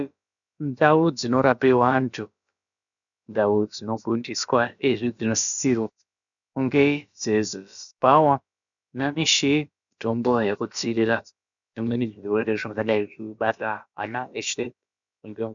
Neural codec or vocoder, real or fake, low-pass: codec, 16 kHz, about 1 kbps, DyCAST, with the encoder's durations; fake; 7.2 kHz